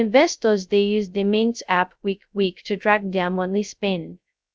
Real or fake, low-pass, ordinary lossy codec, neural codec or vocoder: fake; none; none; codec, 16 kHz, 0.2 kbps, FocalCodec